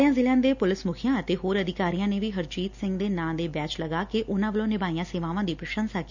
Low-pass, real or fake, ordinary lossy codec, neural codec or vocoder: 7.2 kHz; real; none; none